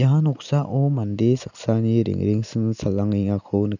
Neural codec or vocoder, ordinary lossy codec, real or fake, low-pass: none; none; real; 7.2 kHz